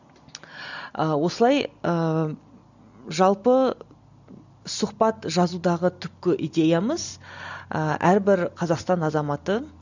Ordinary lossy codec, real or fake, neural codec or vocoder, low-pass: none; real; none; 7.2 kHz